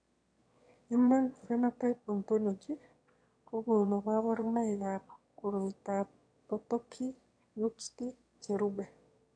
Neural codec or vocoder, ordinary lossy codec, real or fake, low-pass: autoencoder, 22.05 kHz, a latent of 192 numbers a frame, VITS, trained on one speaker; MP3, 64 kbps; fake; 9.9 kHz